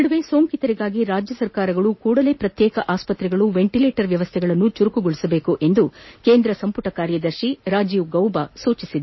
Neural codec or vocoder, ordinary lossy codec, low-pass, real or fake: none; MP3, 24 kbps; 7.2 kHz; real